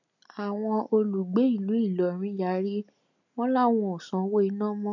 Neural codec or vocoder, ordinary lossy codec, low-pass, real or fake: none; none; 7.2 kHz; real